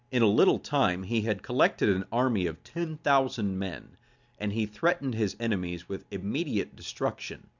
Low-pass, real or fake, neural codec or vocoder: 7.2 kHz; real; none